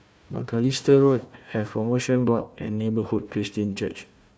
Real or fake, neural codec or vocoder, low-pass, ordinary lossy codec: fake; codec, 16 kHz, 1 kbps, FunCodec, trained on Chinese and English, 50 frames a second; none; none